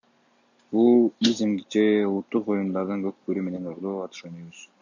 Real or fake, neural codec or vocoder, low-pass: real; none; 7.2 kHz